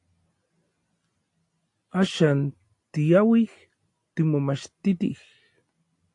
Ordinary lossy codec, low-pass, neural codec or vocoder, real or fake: AAC, 48 kbps; 10.8 kHz; none; real